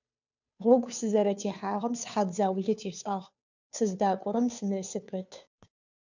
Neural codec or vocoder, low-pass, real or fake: codec, 16 kHz, 2 kbps, FunCodec, trained on Chinese and English, 25 frames a second; 7.2 kHz; fake